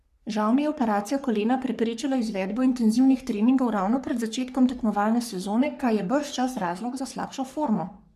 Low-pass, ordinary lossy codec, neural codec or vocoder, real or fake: 14.4 kHz; none; codec, 44.1 kHz, 3.4 kbps, Pupu-Codec; fake